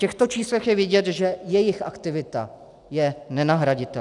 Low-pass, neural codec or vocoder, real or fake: 10.8 kHz; none; real